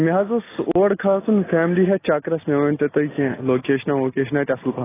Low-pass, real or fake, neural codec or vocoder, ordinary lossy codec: 3.6 kHz; real; none; AAC, 16 kbps